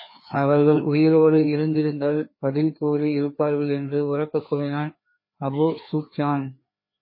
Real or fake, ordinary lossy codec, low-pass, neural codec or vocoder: fake; MP3, 24 kbps; 5.4 kHz; codec, 16 kHz, 2 kbps, FreqCodec, larger model